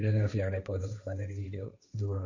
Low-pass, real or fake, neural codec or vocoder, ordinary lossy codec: 7.2 kHz; fake; codec, 16 kHz, 1.1 kbps, Voila-Tokenizer; none